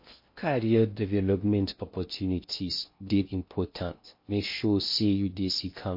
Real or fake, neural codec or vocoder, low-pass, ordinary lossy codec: fake; codec, 16 kHz in and 24 kHz out, 0.6 kbps, FocalCodec, streaming, 2048 codes; 5.4 kHz; MP3, 32 kbps